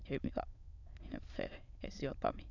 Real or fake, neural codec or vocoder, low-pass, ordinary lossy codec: fake; autoencoder, 22.05 kHz, a latent of 192 numbers a frame, VITS, trained on many speakers; 7.2 kHz; none